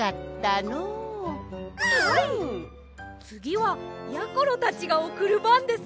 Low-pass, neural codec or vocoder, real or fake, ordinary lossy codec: none; none; real; none